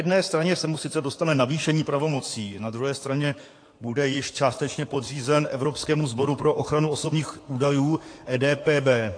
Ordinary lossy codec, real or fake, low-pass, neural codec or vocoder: AAC, 48 kbps; fake; 9.9 kHz; codec, 16 kHz in and 24 kHz out, 2.2 kbps, FireRedTTS-2 codec